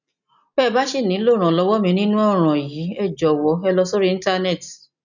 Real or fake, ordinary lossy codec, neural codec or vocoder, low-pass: real; none; none; 7.2 kHz